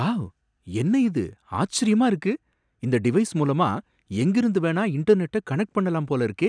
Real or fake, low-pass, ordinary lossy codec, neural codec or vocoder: real; 9.9 kHz; none; none